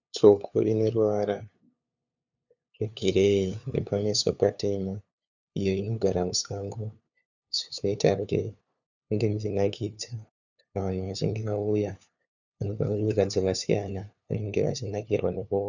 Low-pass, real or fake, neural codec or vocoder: 7.2 kHz; fake; codec, 16 kHz, 2 kbps, FunCodec, trained on LibriTTS, 25 frames a second